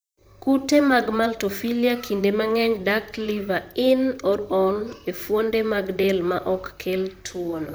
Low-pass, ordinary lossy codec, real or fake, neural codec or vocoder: none; none; fake; vocoder, 44.1 kHz, 128 mel bands, Pupu-Vocoder